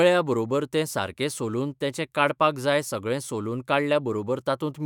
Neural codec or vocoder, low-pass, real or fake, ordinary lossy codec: autoencoder, 48 kHz, 128 numbers a frame, DAC-VAE, trained on Japanese speech; 19.8 kHz; fake; none